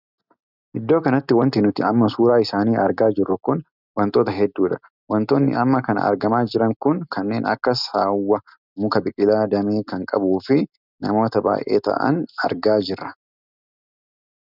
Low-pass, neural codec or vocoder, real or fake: 5.4 kHz; none; real